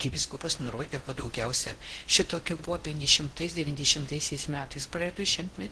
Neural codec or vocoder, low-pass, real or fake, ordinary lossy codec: codec, 16 kHz in and 24 kHz out, 0.6 kbps, FocalCodec, streaming, 4096 codes; 10.8 kHz; fake; Opus, 16 kbps